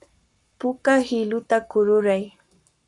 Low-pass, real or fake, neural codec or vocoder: 10.8 kHz; fake; codec, 44.1 kHz, 7.8 kbps, Pupu-Codec